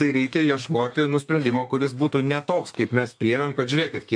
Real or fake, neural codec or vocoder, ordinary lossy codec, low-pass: fake; codec, 32 kHz, 1.9 kbps, SNAC; MP3, 64 kbps; 9.9 kHz